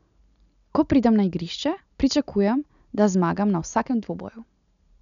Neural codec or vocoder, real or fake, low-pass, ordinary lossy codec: none; real; 7.2 kHz; none